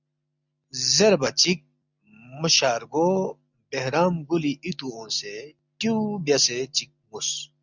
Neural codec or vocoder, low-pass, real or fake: none; 7.2 kHz; real